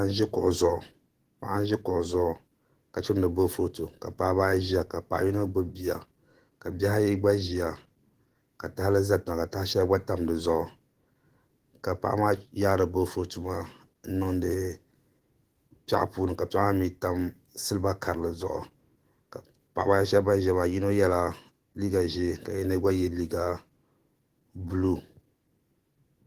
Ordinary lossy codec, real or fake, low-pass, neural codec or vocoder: Opus, 16 kbps; fake; 14.4 kHz; autoencoder, 48 kHz, 128 numbers a frame, DAC-VAE, trained on Japanese speech